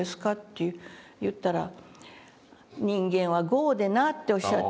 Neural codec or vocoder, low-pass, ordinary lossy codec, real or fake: none; none; none; real